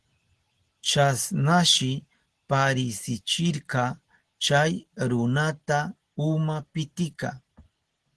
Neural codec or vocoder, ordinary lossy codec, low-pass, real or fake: none; Opus, 16 kbps; 10.8 kHz; real